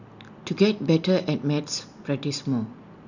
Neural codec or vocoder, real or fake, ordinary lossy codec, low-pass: none; real; none; 7.2 kHz